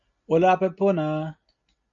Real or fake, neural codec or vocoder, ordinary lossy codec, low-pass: real; none; MP3, 96 kbps; 7.2 kHz